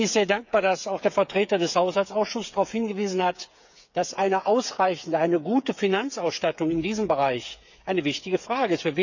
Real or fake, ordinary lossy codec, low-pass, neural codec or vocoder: fake; none; 7.2 kHz; codec, 16 kHz, 8 kbps, FreqCodec, smaller model